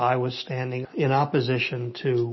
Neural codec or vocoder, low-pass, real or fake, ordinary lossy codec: none; 7.2 kHz; real; MP3, 24 kbps